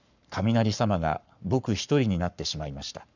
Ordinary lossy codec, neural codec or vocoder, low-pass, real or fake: none; codec, 44.1 kHz, 7.8 kbps, Pupu-Codec; 7.2 kHz; fake